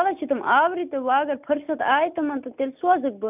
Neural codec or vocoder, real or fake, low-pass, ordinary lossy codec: none; real; 3.6 kHz; none